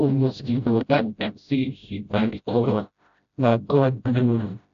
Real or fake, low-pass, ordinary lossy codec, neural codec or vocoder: fake; 7.2 kHz; AAC, 96 kbps; codec, 16 kHz, 0.5 kbps, FreqCodec, smaller model